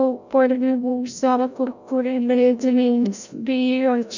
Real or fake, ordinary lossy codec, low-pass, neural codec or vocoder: fake; none; 7.2 kHz; codec, 16 kHz, 0.5 kbps, FreqCodec, larger model